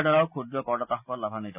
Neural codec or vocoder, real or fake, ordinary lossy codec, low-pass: none; real; none; 3.6 kHz